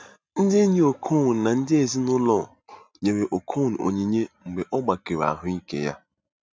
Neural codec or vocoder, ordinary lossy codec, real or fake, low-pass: none; none; real; none